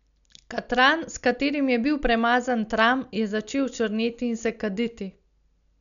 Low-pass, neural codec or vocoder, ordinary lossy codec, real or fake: 7.2 kHz; none; none; real